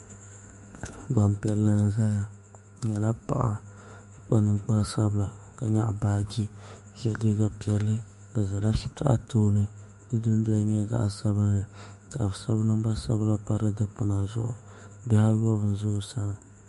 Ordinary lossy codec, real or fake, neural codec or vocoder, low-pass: MP3, 48 kbps; fake; autoencoder, 48 kHz, 32 numbers a frame, DAC-VAE, trained on Japanese speech; 14.4 kHz